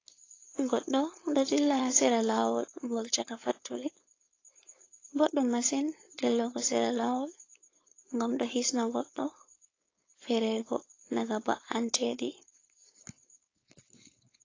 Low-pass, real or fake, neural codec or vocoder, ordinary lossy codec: 7.2 kHz; fake; codec, 16 kHz, 4.8 kbps, FACodec; AAC, 32 kbps